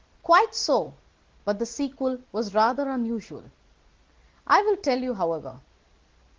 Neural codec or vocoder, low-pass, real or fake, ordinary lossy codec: none; 7.2 kHz; real; Opus, 16 kbps